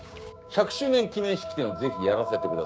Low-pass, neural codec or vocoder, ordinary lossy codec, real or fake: none; codec, 16 kHz, 6 kbps, DAC; none; fake